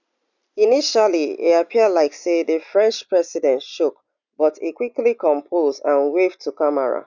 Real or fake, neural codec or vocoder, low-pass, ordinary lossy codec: real; none; 7.2 kHz; none